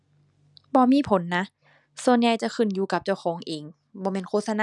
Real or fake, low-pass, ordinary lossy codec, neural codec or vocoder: real; 10.8 kHz; none; none